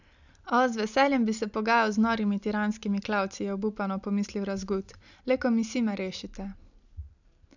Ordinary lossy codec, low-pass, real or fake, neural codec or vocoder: none; 7.2 kHz; real; none